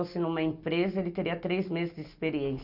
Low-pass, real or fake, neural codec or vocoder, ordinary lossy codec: 5.4 kHz; real; none; none